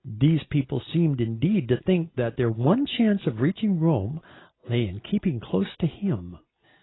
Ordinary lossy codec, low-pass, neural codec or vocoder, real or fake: AAC, 16 kbps; 7.2 kHz; none; real